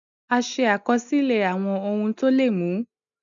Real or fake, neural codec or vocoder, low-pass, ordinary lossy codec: real; none; 7.2 kHz; none